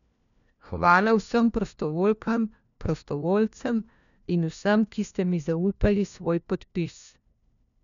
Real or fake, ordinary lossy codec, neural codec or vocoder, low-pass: fake; none; codec, 16 kHz, 1 kbps, FunCodec, trained on LibriTTS, 50 frames a second; 7.2 kHz